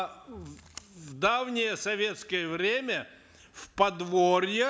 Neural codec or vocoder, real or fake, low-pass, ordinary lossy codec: none; real; none; none